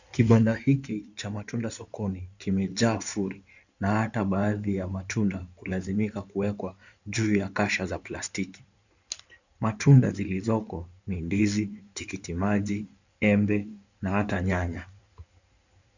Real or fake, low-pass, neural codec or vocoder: fake; 7.2 kHz; codec, 16 kHz in and 24 kHz out, 2.2 kbps, FireRedTTS-2 codec